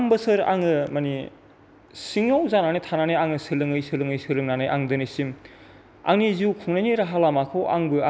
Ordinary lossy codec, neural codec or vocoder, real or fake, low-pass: none; none; real; none